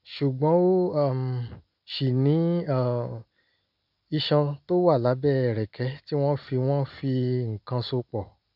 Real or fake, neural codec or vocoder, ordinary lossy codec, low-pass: real; none; none; 5.4 kHz